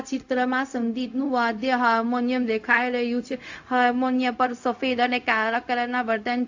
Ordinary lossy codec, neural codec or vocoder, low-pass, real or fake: AAC, 48 kbps; codec, 16 kHz, 0.4 kbps, LongCat-Audio-Codec; 7.2 kHz; fake